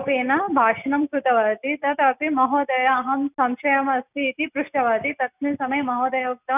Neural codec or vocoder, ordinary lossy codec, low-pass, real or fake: none; none; 3.6 kHz; real